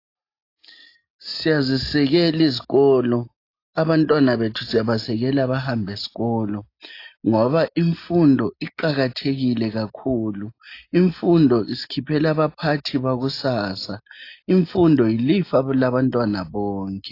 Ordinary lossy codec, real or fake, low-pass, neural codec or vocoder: AAC, 32 kbps; real; 5.4 kHz; none